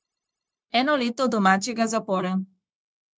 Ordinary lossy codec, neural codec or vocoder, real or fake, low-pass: none; codec, 16 kHz, 0.9 kbps, LongCat-Audio-Codec; fake; none